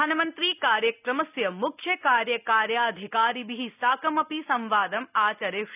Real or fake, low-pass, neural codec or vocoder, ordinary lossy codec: real; 3.6 kHz; none; none